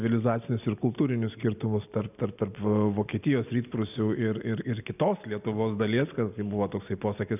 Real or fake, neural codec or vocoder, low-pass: real; none; 3.6 kHz